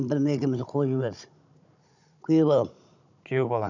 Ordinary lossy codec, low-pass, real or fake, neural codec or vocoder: none; 7.2 kHz; fake; codec, 16 kHz, 16 kbps, FunCodec, trained on Chinese and English, 50 frames a second